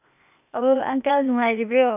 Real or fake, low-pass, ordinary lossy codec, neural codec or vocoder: fake; 3.6 kHz; none; codec, 16 kHz, 0.8 kbps, ZipCodec